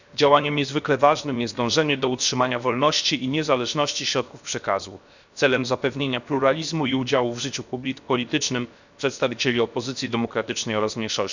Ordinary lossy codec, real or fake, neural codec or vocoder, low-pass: none; fake; codec, 16 kHz, 0.7 kbps, FocalCodec; 7.2 kHz